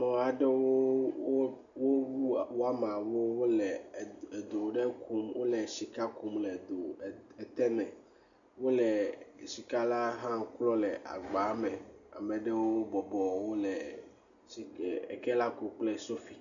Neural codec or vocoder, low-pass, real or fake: none; 7.2 kHz; real